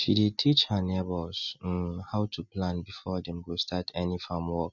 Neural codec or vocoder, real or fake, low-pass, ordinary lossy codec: none; real; 7.2 kHz; none